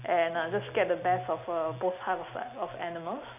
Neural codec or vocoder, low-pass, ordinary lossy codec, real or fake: none; 3.6 kHz; none; real